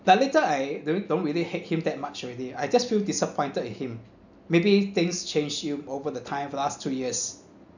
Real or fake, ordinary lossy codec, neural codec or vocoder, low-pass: real; none; none; 7.2 kHz